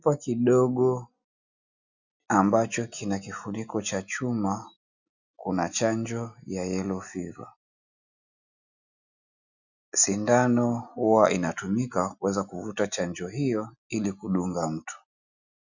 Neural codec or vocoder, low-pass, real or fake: none; 7.2 kHz; real